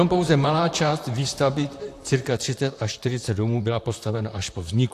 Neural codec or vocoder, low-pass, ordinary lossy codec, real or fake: vocoder, 44.1 kHz, 128 mel bands, Pupu-Vocoder; 14.4 kHz; AAC, 64 kbps; fake